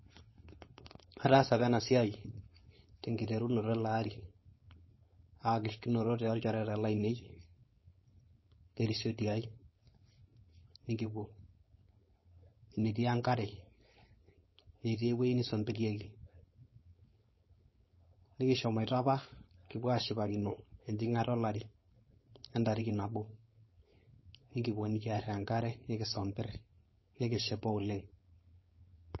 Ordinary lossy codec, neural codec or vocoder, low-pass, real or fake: MP3, 24 kbps; codec, 16 kHz, 4.8 kbps, FACodec; 7.2 kHz; fake